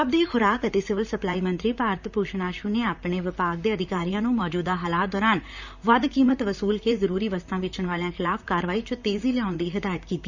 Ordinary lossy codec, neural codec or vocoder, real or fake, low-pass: Opus, 64 kbps; vocoder, 44.1 kHz, 128 mel bands, Pupu-Vocoder; fake; 7.2 kHz